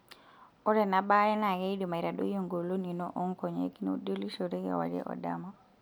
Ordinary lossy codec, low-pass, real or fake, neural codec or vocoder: none; none; real; none